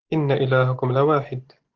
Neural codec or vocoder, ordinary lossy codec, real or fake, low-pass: none; Opus, 16 kbps; real; 7.2 kHz